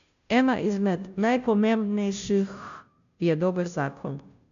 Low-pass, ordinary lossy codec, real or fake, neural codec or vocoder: 7.2 kHz; none; fake; codec, 16 kHz, 0.5 kbps, FunCodec, trained on Chinese and English, 25 frames a second